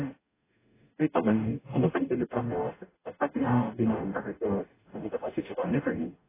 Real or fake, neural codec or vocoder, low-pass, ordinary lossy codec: fake; codec, 44.1 kHz, 0.9 kbps, DAC; 3.6 kHz; AAC, 16 kbps